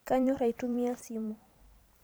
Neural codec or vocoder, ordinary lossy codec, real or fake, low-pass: vocoder, 44.1 kHz, 128 mel bands every 512 samples, BigVGAN v2; none; fake; none